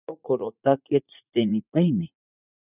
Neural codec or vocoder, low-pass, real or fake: vocoder, 44.1 kHz, 80 mel bands, Vocos; 3.6 kHz; fake